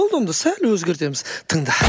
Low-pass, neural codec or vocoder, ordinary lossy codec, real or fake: none; none; none; real